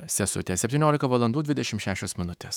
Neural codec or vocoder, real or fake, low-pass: autoencoder, 48 kHz, 128 numbers a frame, DAC-VAE, trained on Japanese speech; fake; 19.8 kHz